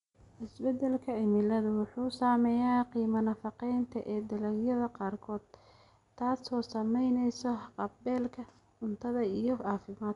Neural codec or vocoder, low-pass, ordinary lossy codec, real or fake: none; 10.8 kHz; none; real